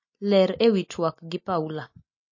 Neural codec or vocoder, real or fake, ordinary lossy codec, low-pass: none; real; MP3, 32 kbps; 7.2 kHz